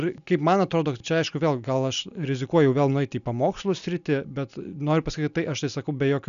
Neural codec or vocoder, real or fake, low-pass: none; real; 7.2 kHz